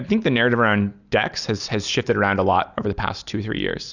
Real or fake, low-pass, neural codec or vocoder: real; 7.2 kHz; none